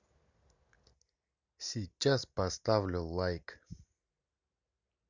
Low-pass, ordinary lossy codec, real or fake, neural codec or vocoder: 7.2 kHz; none; real; none